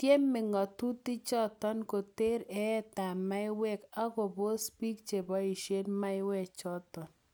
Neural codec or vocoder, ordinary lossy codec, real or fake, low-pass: none; none; real; none